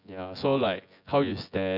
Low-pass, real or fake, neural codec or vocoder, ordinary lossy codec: 5.4 kHz; fake; vocoder, 24 kHz, 100 mel bands, Vocos; none